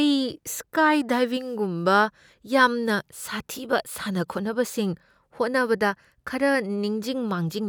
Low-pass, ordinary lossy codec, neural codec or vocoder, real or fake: none; none; none; real